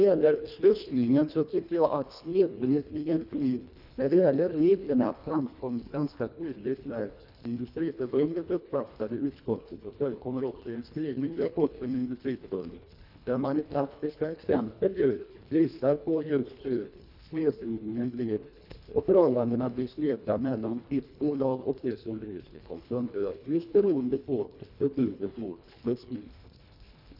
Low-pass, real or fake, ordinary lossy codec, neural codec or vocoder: 5.4 kHz; fake; none; codec, 24 kHz, 1.5 kbps, HILCodec